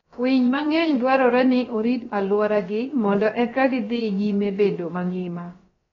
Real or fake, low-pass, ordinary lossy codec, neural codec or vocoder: fake; 7.2 kHz; AAC, 32 kbps; codec, 16 kHz, about 1 kbps, DyCAST, with the encoder's durations